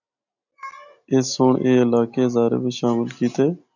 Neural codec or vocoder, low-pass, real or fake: none; 7.2 kHz; real